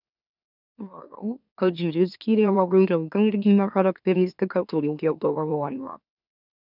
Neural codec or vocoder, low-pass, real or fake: autoencoder, 44.1 kHz, a latent of 192 numbers a frame, MeloTTS; 5.4 kHz; fake